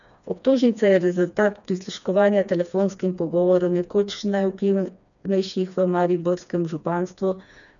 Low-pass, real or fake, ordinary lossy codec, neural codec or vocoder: 7.2 kHz; fake; none; codec, 16 kHz, 2 kbps, FreqCodec, smaller model